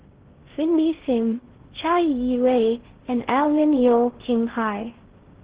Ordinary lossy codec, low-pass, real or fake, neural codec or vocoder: Opus, 16 kbps; 3.6 kHz; fake; codec, 16 kHz in and 24 kHz out, 0.6 kbps, FocalCodec, streaming, 2048 codes